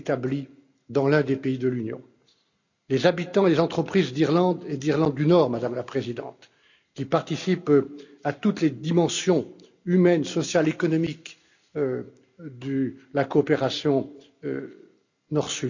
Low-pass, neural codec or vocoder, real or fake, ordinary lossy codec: 7.2 kHz; none; real; none